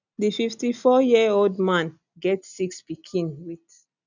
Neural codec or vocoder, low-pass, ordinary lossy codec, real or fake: none; 7.2 kHz; none; real